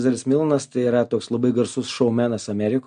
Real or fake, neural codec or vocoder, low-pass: real; none; 9.9 kHz